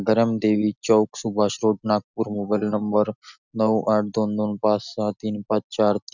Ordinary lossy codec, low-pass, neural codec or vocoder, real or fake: none; 7.2 kHz; none; real